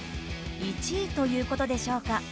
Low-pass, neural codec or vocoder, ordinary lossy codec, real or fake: none; none; none; real